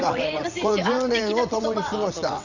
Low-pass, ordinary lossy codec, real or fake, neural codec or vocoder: 7.2 kHz; none; fake; vocoder, 22.05 kHz, 80 mel bands, WaveNeXt